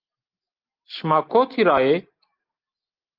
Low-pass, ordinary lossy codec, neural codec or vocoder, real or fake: 5.4 kHz; Opus, 32 kbps; none; real